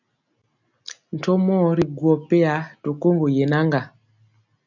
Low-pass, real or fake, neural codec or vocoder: 7.2 kHz; real; none